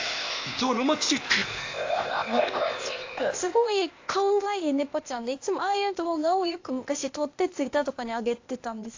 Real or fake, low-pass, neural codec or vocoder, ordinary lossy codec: fake; 7.2 kHz; codec, 16 kHz, 0.8 kbps, ZipCodec; AAC, 48 kbps